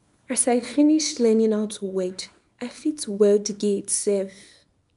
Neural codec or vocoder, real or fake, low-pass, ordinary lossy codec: codec, 24 kHz, 0.9 kbps, WavTokenizer, small release; fake; 10.8 kHz; none